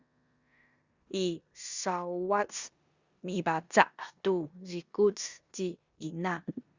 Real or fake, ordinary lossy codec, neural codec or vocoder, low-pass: fake; Opus, 64 kbps; codec, 16 kHz in and 24 kHz out, 0.9 kbps, LongCat-Audio-Codec, four codebook decoder; 7.2 kHz